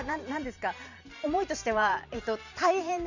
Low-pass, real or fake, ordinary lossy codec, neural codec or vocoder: 7.2 kHz; fake; none; vocoder, 44.1 kHz, 128 mel bands every 512 samples, BigVGAN v2